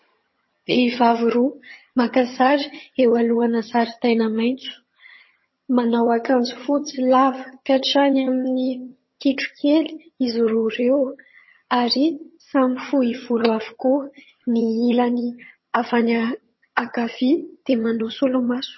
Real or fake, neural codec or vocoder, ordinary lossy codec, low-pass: fake; vocoder, 22.05 kHz, 80 mel bands, HiFi-GAN; MP3, 24 kbps; 7.2 kHz